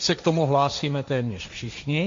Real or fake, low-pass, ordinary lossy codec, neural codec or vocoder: fake; 7.2 kHz; MP3, 48 kbps; codec, 16 kHz, 1.1 kbps, Voila-Tokenizer